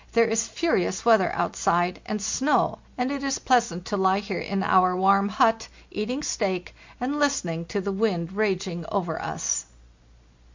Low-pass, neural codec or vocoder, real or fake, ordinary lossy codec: 7.2 kHz; none; real; MP3, 64 kbps